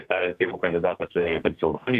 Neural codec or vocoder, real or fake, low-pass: codec, 44.1 kHz, 2.6 kbps, SNAC; fake; 14.4 kHz